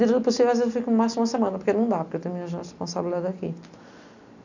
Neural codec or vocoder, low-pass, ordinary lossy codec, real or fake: none; 7.2 kHz; none; real